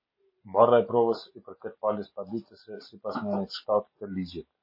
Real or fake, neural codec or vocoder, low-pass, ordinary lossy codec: real; none; 5.4 kHz; MP3, 24 kbps